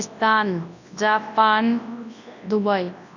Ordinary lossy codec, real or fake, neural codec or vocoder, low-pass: none; fake; codec, 24 kHz, 0.9 kbps, WavTokenizer, large speech release; 7.2 kHz